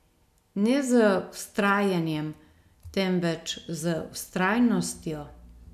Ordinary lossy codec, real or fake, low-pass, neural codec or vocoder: none; real; 14.4 kHz; none